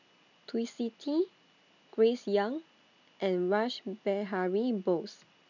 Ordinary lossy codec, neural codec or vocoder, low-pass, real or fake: none; none; 7.2 kHz; real